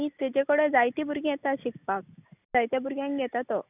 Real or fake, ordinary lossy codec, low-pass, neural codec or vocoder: real; none; 3.6 kHz; none